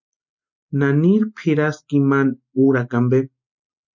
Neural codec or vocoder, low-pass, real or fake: none; 7.2 kHz; real